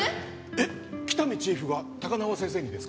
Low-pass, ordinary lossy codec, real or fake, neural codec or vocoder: none; none; real; none